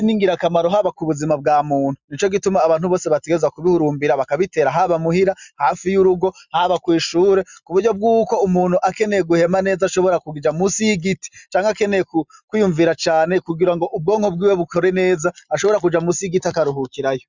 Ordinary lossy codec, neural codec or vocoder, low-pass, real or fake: Opus, 64 kbps; none; 7.2 kHz; real